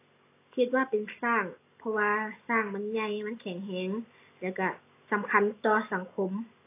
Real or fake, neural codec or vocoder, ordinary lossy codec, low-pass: real; none; AAC, 24 kbps; 3.6 kHz